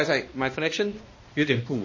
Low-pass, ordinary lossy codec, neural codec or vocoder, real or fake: 7.2 kHz; MP3, 32 kbps; codec, 16 kHz, 1 kbps, X-Codec, HuBERT features, trained on balanced general audio; fake